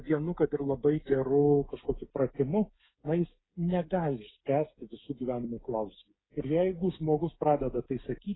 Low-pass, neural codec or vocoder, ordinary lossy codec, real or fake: 7.2 kHz; codec, 16 kHz, 8 kbps, FreqCodec, smaller model; AAC, 16 kbps; fake